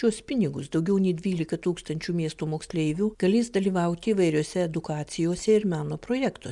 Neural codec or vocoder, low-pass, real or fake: none; 10.8 kHz; real